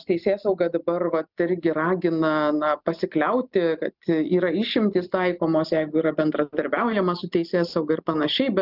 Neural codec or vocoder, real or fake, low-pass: none; real; 5.4 kHz